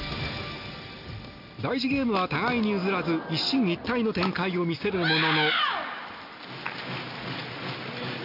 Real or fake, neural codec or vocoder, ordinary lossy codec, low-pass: real; none; none; 5.4 kHz